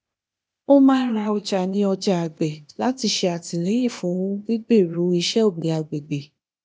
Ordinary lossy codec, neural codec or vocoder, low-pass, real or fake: none; codec, 16 kHz, 0.8 kbps, ZipCodec; none; fake